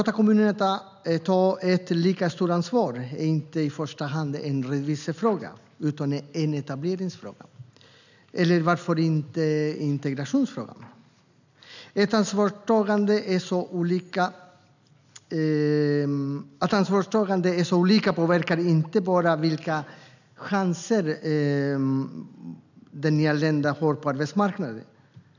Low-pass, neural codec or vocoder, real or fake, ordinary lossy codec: 7.2 kHz; none; real; none